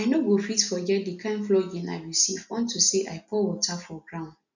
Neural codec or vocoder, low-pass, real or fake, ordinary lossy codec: none; 7.2 kHz; real; none